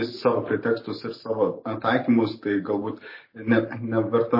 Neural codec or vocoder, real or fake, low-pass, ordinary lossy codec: none; real; 5.4 kHz; MP3, 24 kbps